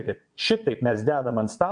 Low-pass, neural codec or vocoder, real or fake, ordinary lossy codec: 9.9 kHz; vocoder, 22.05 kHz, 80 mel bands, WaveNeXt; fake; MP3, 64 kbps